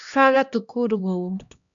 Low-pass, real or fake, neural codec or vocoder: 7.2 kHz; fake; codec, 16 kHz, 1 kbps, X-Codec, HuBERT features, trained on LibriSpeech